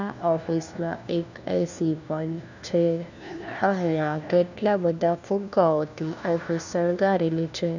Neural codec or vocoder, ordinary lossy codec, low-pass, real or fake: codec, 16 kHz, 1 kbps, FunCodec, trained on LibriTTS, 50 frames a second; none; 7.2 kHz; fake